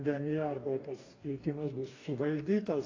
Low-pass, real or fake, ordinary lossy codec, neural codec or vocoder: 7.2 kHz; fake; AAC, 32 kbps; codec, 44.1 kHz, 2.6 kbps, DAC